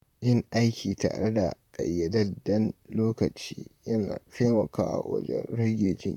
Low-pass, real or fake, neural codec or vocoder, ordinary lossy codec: 19.8 kHz; fake; vocoder, 44.1 kHz, 128 mel bands, Pupu-Vocoder; none